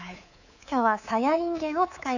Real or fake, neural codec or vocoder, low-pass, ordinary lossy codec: fake; codec, 24 kHz, 3.1 kbps, DualCodec; 7.2 kHz; none